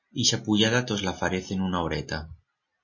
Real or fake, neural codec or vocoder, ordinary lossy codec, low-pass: real; none; MP3, 32 kbps; 7.2 kHz